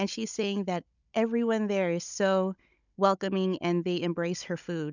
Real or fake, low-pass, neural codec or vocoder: fake; 7.2 kHz; codec, 16 kHz, 16 kbps, FunCodec, trained on Chinese and English, 50 frames a second